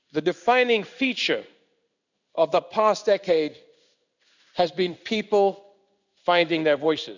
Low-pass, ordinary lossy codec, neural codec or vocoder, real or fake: 7.2 kHz; none; codec, 16 kHz in and 24 kHz out, 1 kbps, XY-Tokenizer; fake